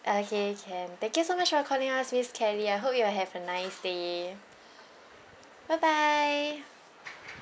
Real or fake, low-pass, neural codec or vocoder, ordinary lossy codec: real; none; none; none